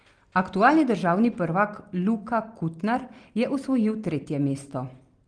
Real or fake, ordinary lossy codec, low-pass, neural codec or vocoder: real; Opus, 24 kbps; 9.9 kHz; none